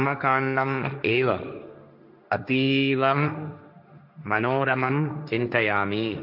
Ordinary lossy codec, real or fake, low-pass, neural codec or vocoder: none; fake; 5.4 kHz; codec, 16 kHz, 1.1 kbps, Voila-Tokenizer